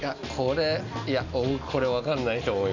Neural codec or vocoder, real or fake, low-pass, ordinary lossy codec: none; real; 7.2 kHz; none